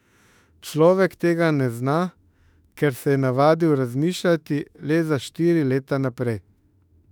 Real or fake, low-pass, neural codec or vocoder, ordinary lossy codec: fake; 19.8 kHz; autoencoder, 48 kHz, 32 numbers a frame, DAC-VAE, trained on Japanese speech; none